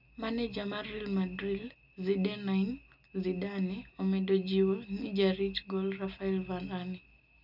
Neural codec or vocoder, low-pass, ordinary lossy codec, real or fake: none; 5.4 kHz; none; real